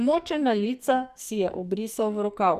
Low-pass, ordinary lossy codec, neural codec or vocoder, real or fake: 14.4 kHz; Opus, 64 kbps; codec, 44.1 kHz, 2.6 kbps, SNAC; fake